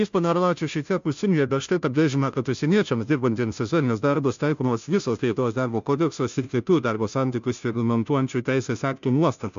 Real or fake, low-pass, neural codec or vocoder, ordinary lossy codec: fake; 7.2 kHz; codec, 16 kHz, 0.5 kbps, FunCodec, trained on Chinese and English, 25 frames a second; MP3, 64 kbps